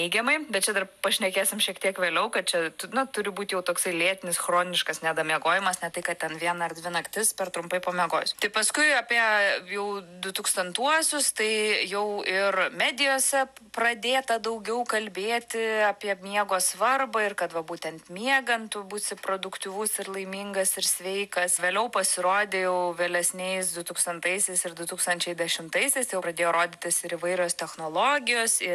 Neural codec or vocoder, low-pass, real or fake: none; 14.4 kHz; real